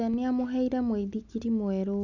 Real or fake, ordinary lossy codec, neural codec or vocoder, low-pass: real; none; none; 7.2 kHz